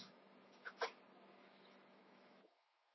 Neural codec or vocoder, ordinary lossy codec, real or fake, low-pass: autoencoder, 48 kHz, 128 numbers a frame, DAC-VAE, trained on Japanese speech; MP3, 24 kbps; fake; 7.2 kHz